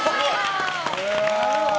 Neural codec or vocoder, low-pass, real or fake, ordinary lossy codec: none; none; real; none